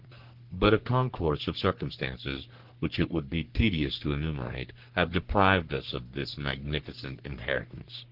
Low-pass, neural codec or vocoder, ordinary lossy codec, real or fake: 5.4 kHz; codec, 44.1 kHz, 3.4 kbps, Pupu-Codec; Opus, 16 kbps; fake